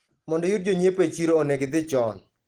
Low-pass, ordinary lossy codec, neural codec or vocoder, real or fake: 19.8 kHz; Opus, 16 kbps; none; real